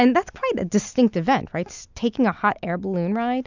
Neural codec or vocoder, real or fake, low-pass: none; real; 7.2 kHz